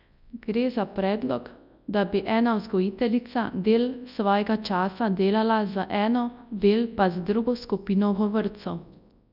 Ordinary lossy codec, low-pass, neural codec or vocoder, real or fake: none; 5.4 kHz; codec, 24 kHz, 0.9 kbps, WavTokenizer, large speech release; fake